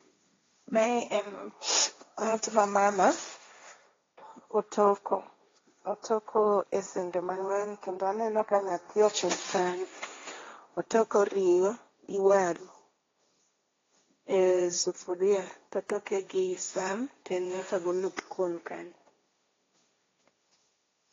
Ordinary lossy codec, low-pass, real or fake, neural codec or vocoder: AAC, 32 kbps; 7.2 kHz; fake; codec, 16 kHz, 1.1 kbps, Voila-Tokenizer